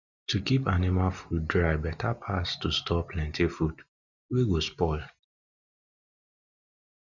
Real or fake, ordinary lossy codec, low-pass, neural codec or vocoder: real; none; 7.2 kHz; none